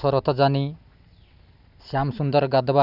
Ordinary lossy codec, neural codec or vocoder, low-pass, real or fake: none; none; 5.4 kHz; real